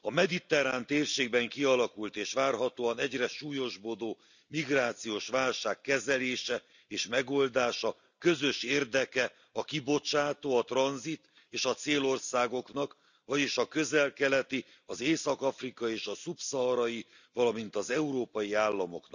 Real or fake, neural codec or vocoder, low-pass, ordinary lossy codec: real; none; 7.2 kHz; none